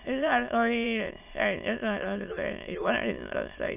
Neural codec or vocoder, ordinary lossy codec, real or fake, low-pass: autoencoder, 22.05 kHz, a latent of 192 numbers a frame, VITS, trained on many speakers; none; fake; 3.6 kHz